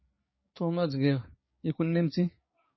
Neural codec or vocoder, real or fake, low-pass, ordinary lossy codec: codec, 16 kHz, 6 kbps, DAC; fake; 7.2 kHz; MP3, 24 kbps